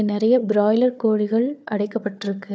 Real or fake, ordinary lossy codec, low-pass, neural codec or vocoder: fake; none; none; codec, 16 kHz, 4 kbps, FunCodec, trained on Chinese and English, 50 frames a second